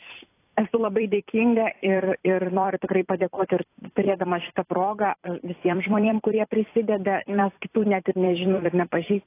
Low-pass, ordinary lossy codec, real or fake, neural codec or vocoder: 3.6 kHz; AAC, 24 kbps; fake; vocoder, 44.1 kHz, 128 mel bands every 256 samples, BigVGAN v2